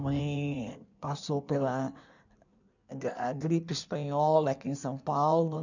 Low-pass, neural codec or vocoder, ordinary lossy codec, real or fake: 7.2 kHz; codec, 16 kHz in and 24 kHz out, 1.1 kbps, FireRedTTS-2 codec; none; fake